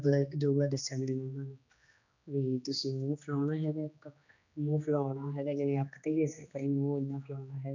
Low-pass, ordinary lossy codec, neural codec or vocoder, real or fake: 7.2 kHz; none; codec, 16 kHz, 2 kbps, X-Codec, HuBERT features, trained on general audio; fake